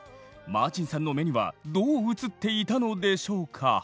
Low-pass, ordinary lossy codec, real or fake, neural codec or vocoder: none; none; real; none